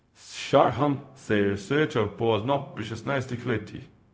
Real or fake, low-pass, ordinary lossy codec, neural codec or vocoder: fake; none; none; codec, 16 kHz, 0.4 kbps, LongCat-Audio-Codec